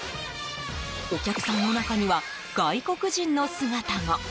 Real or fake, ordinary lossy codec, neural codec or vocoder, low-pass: real; none; none; none